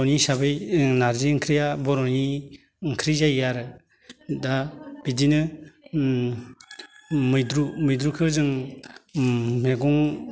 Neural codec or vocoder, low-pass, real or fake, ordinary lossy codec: none; none; real; none